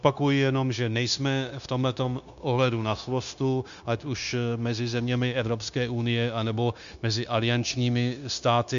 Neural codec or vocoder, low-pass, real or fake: codec, 16 kHz, 0.9 kbps, LongCat-Audio-Codec; 7.2 kHz; fake